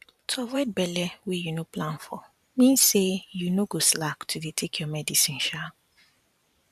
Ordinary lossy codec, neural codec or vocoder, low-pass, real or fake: none; vocoder, 44.1 kHz, 128 mel bands every 512 samples, BigVGAN v2; 14.4 kHz; fake